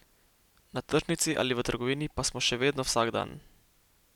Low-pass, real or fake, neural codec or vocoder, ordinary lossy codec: 19.8 kHz; real; none; none